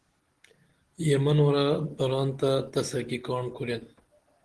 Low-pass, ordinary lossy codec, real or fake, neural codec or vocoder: 10.8 kHz; Opus, 16 kbps; real; none